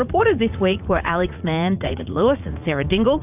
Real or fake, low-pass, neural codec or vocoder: fake; 3.6 kHz; vocoder, 44.1 kHz, 80 mel bands, Vocos